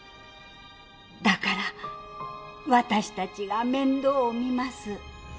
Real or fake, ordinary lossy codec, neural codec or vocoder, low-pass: real; none; none; none